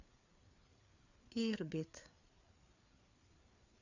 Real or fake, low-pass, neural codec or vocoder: fake; 7.2 kHz; codec, 16 kHz, 4 kbps, FreqCodec, larger model